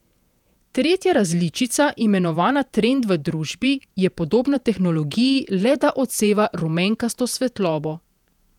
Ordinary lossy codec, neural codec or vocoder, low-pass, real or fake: none; vocoder, 48 kHz, 128 mel bands, Vocos; 19.8 kHz; fake